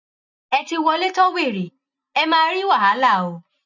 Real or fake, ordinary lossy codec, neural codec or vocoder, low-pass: real; none; none; 7.2 kHz